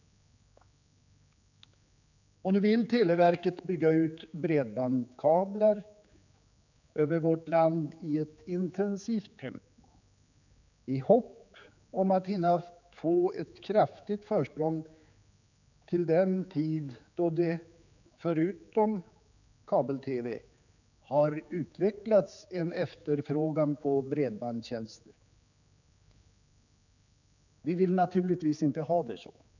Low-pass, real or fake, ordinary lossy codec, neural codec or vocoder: 7.2 kHz; fake; none; codec, 16 kHz, 4 kbps, X-Codec, HuBERT features, trained on general audio